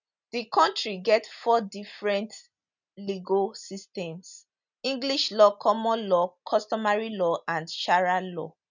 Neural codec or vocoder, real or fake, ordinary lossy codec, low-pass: none; real; none; 7.2 kHz